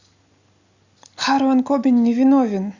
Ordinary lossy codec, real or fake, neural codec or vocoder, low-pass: Opus, 64 kbps; real; none; 7.2 kHz